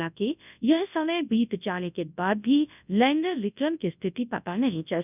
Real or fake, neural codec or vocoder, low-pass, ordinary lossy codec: fake; codec, 24 kHz, 0.9 kbps, WavTokenizer, large speech release; 3.6 kHz; none